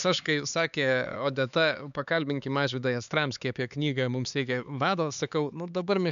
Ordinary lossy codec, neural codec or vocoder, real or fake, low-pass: MP3, 96 kbps; codec, 16 kHz, 4 kbps, X-Codec, HuBERT features, trained on LibriSpeech; fake; 7.2 kHz